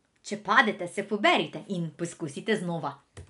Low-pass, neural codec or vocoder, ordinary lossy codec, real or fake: 10.8 kHz; none; none; real